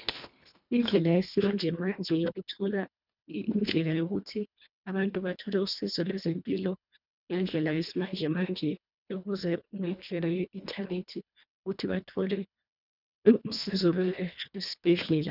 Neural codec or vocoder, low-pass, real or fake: codec, 24 kHz, 1.5 kbps, HILCodec; 5.4 kHz; fake